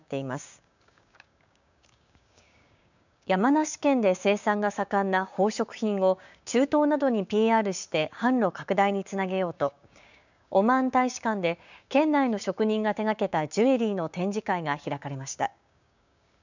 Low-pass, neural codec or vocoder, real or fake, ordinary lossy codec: 7.2 kHz; codec, 16 kHz, 6 kbps, DAC; fake; none